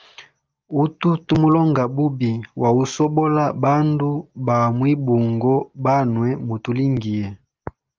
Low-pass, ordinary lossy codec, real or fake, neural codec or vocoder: 7.2 kHz; Opus, 24 kbps; real; none